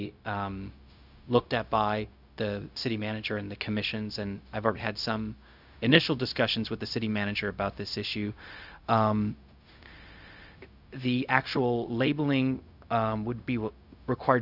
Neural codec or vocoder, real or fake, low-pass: codec, 16 kHz, 0.4 kbps, LongCat-Audio-Codec; fake; 5.4 kHz